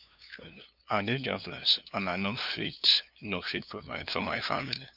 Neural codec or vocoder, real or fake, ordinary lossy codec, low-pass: codec, 16 kHz, 2 kbps, FunCodec, trained on LibriTTS, 25 frames a second; fake; none; 5.4 kHz